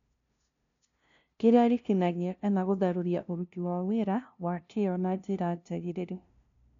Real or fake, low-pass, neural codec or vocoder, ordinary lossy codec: fake; 7.2 kHz; codec, 16 kHz, 0.5 kbps, FunCodec, trained on LibriTTS, 25 frames a second; none